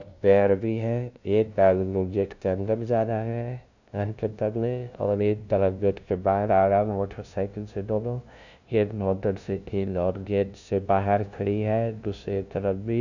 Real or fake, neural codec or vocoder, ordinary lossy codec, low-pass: fake; codec, 16 kHz, 0.5 kbps, FunCodec, trained on LibriTTS, 25 frames a second; none; 7.2 kHz